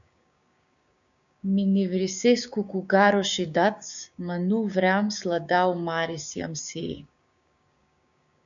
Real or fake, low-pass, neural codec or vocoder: fake; 7.2 kHz; codec, 16 kHz, 6 kbps, DAC